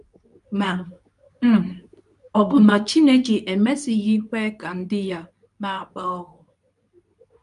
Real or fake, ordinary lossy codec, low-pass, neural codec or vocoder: fake; none; 10.8 kHz; codec, 24 kHz, 0.9 kbps, WavTokenizer, medium speech release version 2